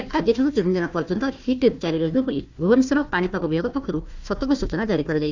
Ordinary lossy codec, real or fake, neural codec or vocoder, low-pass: none; fake; codec, 16 kHz, 1 kbps, FunCodec, trained on Chinese and English, 50 frames a second; 7.2 kHz